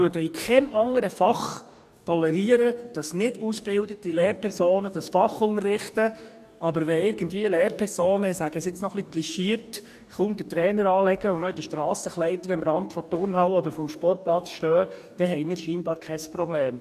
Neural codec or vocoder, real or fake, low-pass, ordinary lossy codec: codec, 44.1 kHz, 2.6 kbps, DAC; fake; 14.4 kHz; none